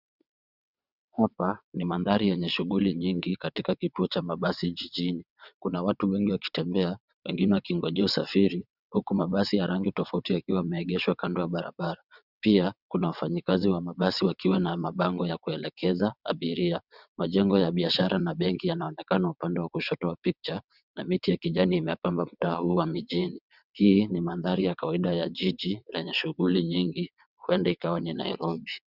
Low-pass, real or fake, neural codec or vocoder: 5.4 kHz; fake; vocoder, 22.05 kHz, 80 mel bands, WaveNeXt